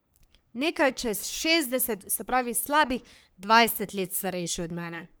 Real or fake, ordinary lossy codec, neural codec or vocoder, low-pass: fake; none; codec, 44.1 kHz, 3.4 kbps, Pupu-Codec; none